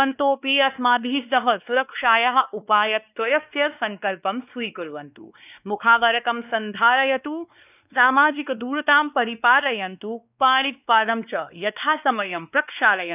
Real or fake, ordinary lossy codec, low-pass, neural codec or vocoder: fake; none; 3.6 kHz; codec, 16 kHz, 2 kbps, X-Codec, WavLM features, trained on Multilingual LibriSpeech